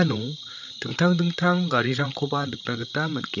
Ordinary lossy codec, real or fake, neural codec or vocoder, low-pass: none; fake; codec, 16 kHz, 8 kbps, FreqCodec, larger model; 7.2 kHz